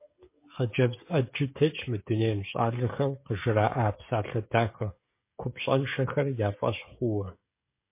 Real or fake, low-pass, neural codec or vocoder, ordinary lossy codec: fake; 3.6 kHz; codec, 16 kHz, 16 kbps, FreqCodec, smaller model; MP3, 24 kbps